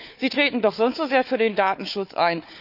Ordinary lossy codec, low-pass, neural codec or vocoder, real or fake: none; 5.4 kHz; codec, 16 kHz, 4 kbps, FunCodec, trained on Chinese and English, 50 frames a second; fake